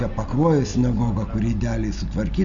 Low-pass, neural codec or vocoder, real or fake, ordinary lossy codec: 7.2 kHz; none; real; AAC, 64 kbps